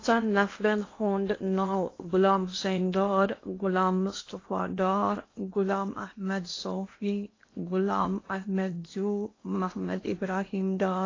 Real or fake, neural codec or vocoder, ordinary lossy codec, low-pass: fake; codec, 16 kHz in and 24 kHz out, 0.8 kbps, FocalCodec, streaming, 65536 codes; AAC, 32 kbps; 7.2 kHz